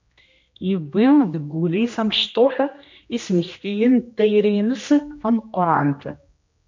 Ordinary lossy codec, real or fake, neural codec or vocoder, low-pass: MP3, 64 kbps; fake; codec, 16 kHz, 1 kbps, X-Codec, HuBERT features, trained on general audio; 7.2 kHz